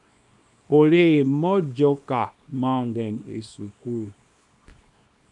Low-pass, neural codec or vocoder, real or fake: 10.8 kHz; codec, 24 kHz, 0.9 kbps, WavTokenizer, small release; fake